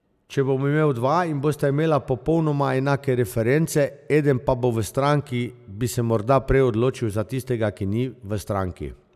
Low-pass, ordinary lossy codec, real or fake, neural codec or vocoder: 14.4 kHz; none; real; none